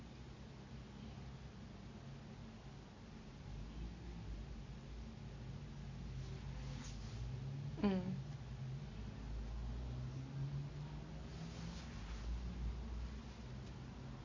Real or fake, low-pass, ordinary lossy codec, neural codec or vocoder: real; 7.2 kHz; MP3, 32 kbps; none